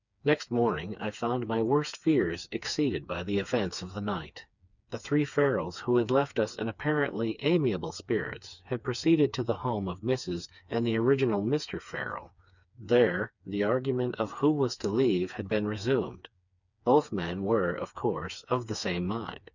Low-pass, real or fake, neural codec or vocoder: 7.2 kHz; fake; codec, 16 kHz, 4 kbps, FreqCodec, smaller model